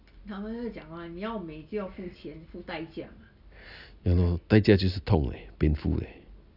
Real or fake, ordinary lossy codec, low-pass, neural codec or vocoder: real; none; 5.4 kHz; none